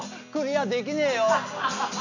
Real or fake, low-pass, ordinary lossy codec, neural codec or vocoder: real; 7.2 kHz; none; none